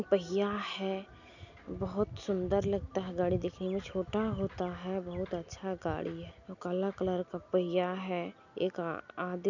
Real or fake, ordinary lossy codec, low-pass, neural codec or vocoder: real; none; 7.2 kHz; none